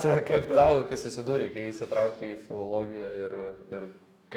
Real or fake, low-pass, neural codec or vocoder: fake; 19.8 kHz; codec, 44.1 kHz, 2.6 kbps, DAC